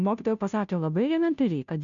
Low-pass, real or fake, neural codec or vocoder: 7.2 kHz; fake; codec, 16 kHz, 0.5 kbps, FunCodec, trained on Chinese and English, 25 frames a second